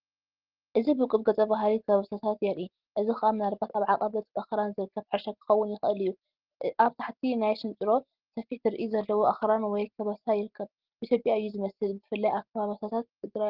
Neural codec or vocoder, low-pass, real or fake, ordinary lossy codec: none; 5.4 kHz; real; Opus, 16 kbps